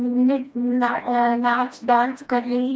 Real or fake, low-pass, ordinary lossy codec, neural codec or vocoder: fake; none; none; codec, 16 kHz, 1 kbps, FreqCodec, smaller model